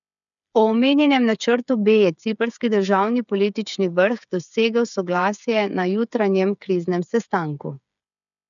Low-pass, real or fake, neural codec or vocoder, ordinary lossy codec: 7.2 kHz; fake; codec, 16 kHz, 8 kbps, FreqCodec, smaller model; none